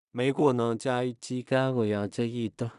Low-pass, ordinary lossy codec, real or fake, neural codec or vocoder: 10.8 kHz; none; fake; codec, 16 kHz in and 24 kHz out, 0.4 kbps, LongCat-Audio-Codec, two codebook decoder